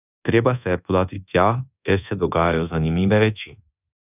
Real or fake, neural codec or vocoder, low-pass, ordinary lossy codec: fake; codec, 24 kHz, 1.2 kbps, DualCodec; 3.6 kHz; none